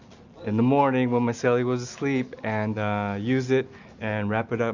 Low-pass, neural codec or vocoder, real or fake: 7.2 kHz; none; real